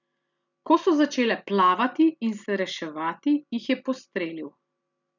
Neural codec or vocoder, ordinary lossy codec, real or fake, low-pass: none; none; real; 7.2 kHz